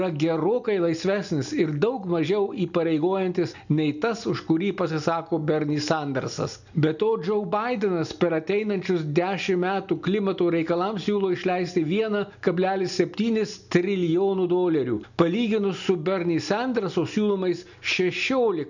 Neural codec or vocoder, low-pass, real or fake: none; 7.2 kHz; real